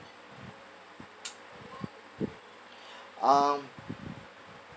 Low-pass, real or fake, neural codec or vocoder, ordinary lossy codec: none; real; none; none